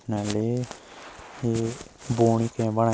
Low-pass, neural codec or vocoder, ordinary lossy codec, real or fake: none; none; none; real